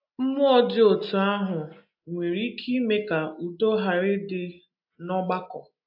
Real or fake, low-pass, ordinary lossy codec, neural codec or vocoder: real; 5.4 kHz; AAC, 48 kbps; none